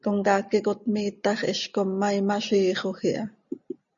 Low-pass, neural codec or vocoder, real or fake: 7.2 kHz; none; real